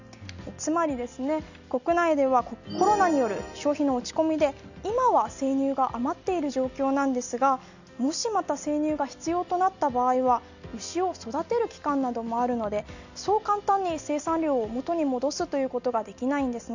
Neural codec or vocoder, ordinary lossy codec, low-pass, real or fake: none; none; 7.2 kHz; real